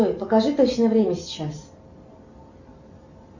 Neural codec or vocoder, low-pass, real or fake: none; 7.2 kHz; real